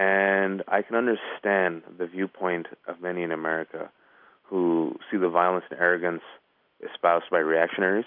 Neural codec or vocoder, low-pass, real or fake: none; 5.4 kHz; real